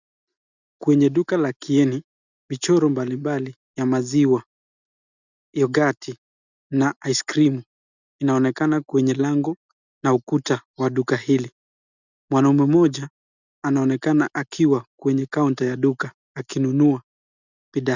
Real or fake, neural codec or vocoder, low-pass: real; none; 7.2 kHz